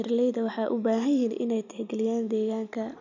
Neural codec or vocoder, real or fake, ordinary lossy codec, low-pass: none; real; none; 7.2 kHz